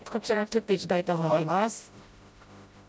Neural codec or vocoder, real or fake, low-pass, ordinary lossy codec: codec, 16 kHz, 0.5 kbps, FreqCodec, smaller model; fake; none; none